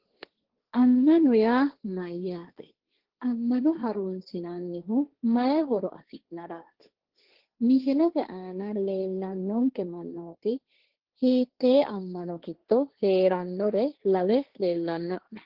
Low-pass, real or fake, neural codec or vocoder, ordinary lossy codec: 5.4 kHz; fake; codec, 16 kHz, 1.1 kbps, Voila-Tokenizer; Opus, 16 kbps